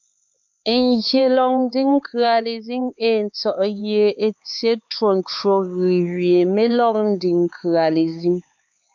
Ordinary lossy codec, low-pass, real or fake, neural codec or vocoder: MP3, 64 kbps; 7.2 kHz; fake; codec, 16 kHz, 4 kbps, X-Codec, HuBERT features, trained on LibriSpeech